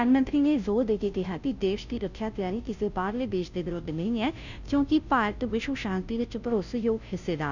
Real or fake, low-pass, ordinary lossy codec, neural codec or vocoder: fake; 7.2 kHz; none; codec, 16 kHz, 0.5 kbps, FunCodec, trained on Chinese and English, 25 frames a second